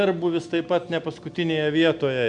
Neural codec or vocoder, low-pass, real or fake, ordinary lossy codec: none; 9.9 kHz; real; MP3, 96 kbps